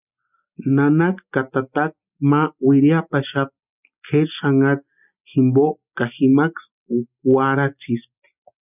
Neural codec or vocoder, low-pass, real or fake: none; 3.6 kHz; real